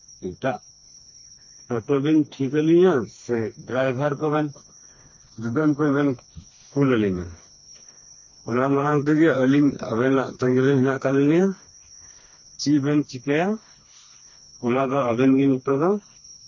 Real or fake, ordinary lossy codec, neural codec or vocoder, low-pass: fake; MP3, 32 kbps; codec, 16 kHz, 2 kbps, FreqCodec, smaller model; 7.2 kHz